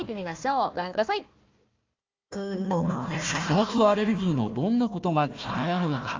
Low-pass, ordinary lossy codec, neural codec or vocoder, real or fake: 7.2 kHz; Opus, 32 kbps; codec, 16 kHz, 1 kbps, FunCodec, trained on Chinese and English, 50 frames a second; fake